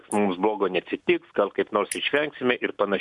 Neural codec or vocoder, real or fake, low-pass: none; real; 10.8 kHz